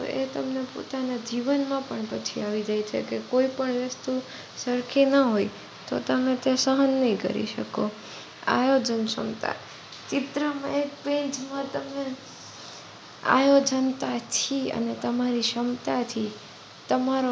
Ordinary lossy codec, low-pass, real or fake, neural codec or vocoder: none; none; real; none